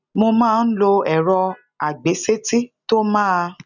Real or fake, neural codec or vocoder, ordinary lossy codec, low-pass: real; none; none; 7.2 kHz